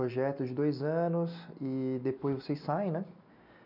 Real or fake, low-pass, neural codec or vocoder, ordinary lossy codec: real; 5.4 kHz; none; none